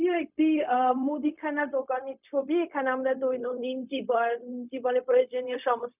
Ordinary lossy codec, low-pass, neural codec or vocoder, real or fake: none; 3.6 kHz; codec, 16 kHz, 0.4 kbps, LongCat-Audio-Codec; fake